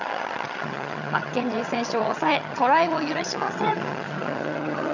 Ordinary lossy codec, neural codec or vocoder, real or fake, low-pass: none; vocoder, 22.05 kHz, 80 mel bands, HiFi-GAN; fake; 7.2 kHz